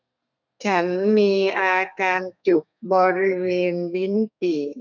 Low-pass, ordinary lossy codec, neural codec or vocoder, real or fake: 7.2 kHz; none; codec, 32 kHz, 1.9 kbps, SNAC; fake